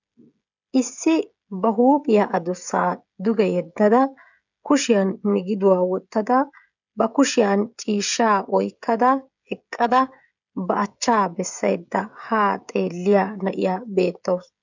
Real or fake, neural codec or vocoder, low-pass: fake; codec, 16 kHz, 16 kbps, FreqCodec, smaller model; 7.2 kHz